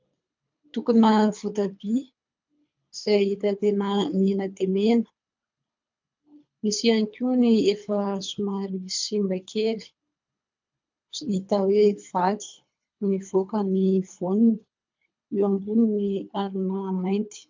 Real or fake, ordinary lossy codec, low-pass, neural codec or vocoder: fake; MP3, 64 kbps; 7.2 kHz; codec, 24 kHz, 3 kbps, HILCodec